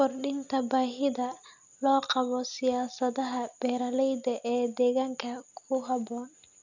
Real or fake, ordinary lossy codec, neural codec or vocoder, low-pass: real; none; none; 7.2 kHz